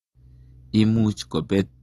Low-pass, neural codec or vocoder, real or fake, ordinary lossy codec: 14.4 kHz; none; real; AAC, 32 kbps